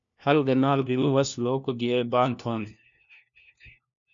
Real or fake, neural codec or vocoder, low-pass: fake; codec, 16 kHz, 1 kbps, FunCodec, trained on LibriTTS, 50 frames a second; 7.2 kHz